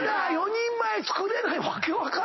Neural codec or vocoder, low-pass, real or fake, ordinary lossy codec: none; 7.2 kHz; real; MP3, 24 kbps